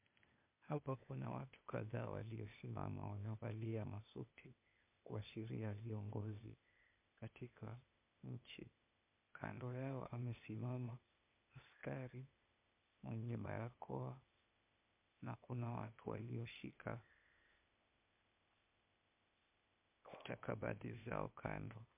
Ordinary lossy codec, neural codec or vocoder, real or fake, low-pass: MP3, 32 kbps; codec, 16 kHz, 0.8 kbps, ZipCodec; fake; 3.6 kHz